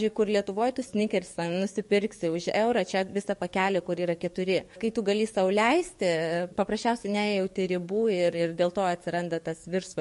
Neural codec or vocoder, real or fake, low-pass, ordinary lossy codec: codec, 44.1 kHz, 7.8 kbps, DAC; fake; 14.4 kHz; MP3, 48 kbps